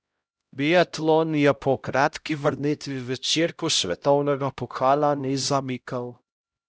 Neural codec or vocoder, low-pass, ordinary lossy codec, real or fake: codec, 16 kHz, 0.5 kbps, X-Codec, HuBERT features, trained on LibriSpeech; none; none; fake